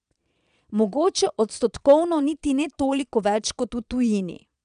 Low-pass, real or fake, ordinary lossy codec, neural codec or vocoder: 9.9 kHz; fake; none; vocoder, 22.05 kHz, 80 mel bands, Vocos